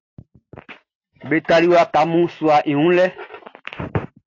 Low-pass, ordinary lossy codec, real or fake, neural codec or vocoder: 7.2 kHz; AAC, 32 kbps; real; none